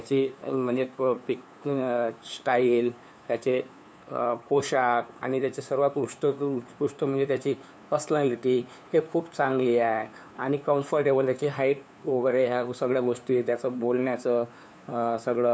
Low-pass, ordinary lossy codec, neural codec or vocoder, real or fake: none; none; codec, 16 kHz, 2 kbps, FunCodec, trained on LibriTTS, 25 frames a second; fake